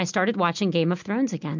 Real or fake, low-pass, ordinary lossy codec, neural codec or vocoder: real; 7.2 kHz; MP3, 64 kbps; none